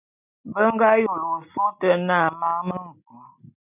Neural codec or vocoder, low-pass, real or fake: none; 3.6 kHz; real